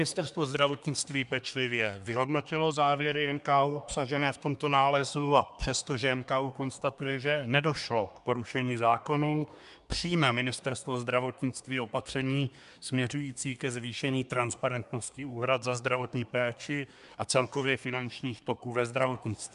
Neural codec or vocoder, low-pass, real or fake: codec, 24 kHz, 1 kbps, SNAC; 10.8 kHz; fake